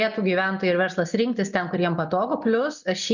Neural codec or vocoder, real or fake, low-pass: none; real; 7.2 kHz